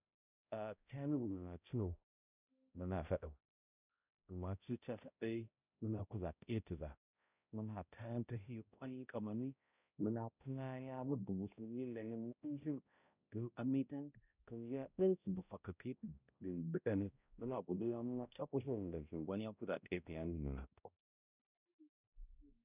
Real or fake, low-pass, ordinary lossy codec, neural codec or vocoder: fake; 3.6 kHz; none; codec, 16 kHz, 0.5 kbps, X-Codec, HuBERT features, trained on balanced general audio